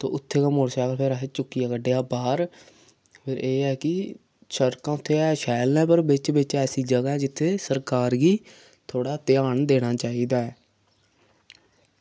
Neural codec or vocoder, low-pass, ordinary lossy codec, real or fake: none; none; none; real